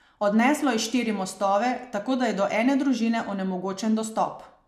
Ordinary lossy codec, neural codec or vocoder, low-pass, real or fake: MP3, 96 kbps; none; 14.4 kHz; real